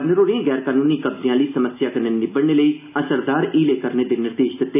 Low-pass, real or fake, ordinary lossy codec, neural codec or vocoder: 3.6 kHz; real; none; none